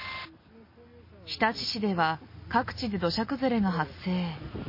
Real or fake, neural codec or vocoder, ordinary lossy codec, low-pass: real; none; MP3, 24 kbps; 5.4 kHz